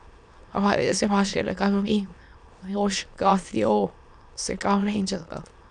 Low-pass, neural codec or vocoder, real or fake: 9.9 kHz; autoencoder, 22.05 kHz, a latent of 192 numbers a frame, VITS, trained on many speakers; fake